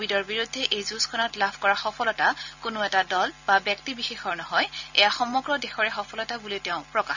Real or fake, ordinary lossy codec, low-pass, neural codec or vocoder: real; none; 7.2 kHz; none